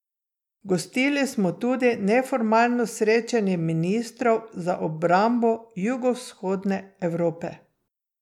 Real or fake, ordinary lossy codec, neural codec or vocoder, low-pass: real; none; none; 19.8 kHz